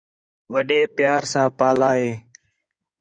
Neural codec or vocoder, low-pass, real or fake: codec, 16 kHz in and 24 kHz out, 2.2 kbps, FireRedTTS-2 codec; 9.9 kHz; fake